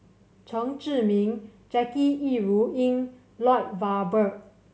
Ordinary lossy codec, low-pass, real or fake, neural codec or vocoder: none; none; real; none